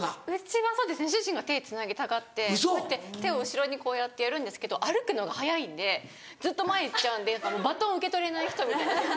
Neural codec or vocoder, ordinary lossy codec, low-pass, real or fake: none; none; none; real